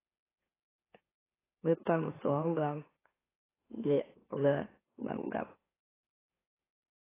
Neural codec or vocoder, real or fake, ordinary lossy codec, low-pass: autoencoder, 44.1 kHz, a latent of 192 numbers a frame, MeloTTS; fake; AAC, 16 kbps; 3.6 kHz